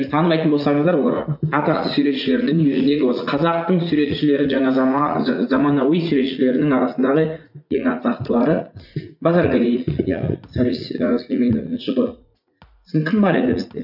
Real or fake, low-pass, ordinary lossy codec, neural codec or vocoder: fake; 5.4 kHz; none; vocoder, 44.1 kHz, 80 mel bands, Vocos